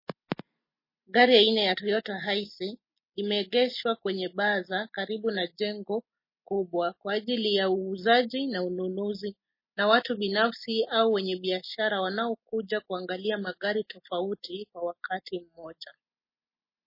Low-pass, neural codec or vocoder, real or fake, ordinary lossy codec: 5.4 kHz; none; real; MP3, 24 kbps